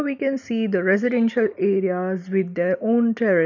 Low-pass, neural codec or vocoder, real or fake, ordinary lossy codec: 7.2 kHz; none; real; none